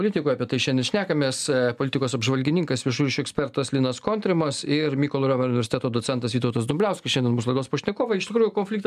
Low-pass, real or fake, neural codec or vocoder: 14.4 kHz; real; none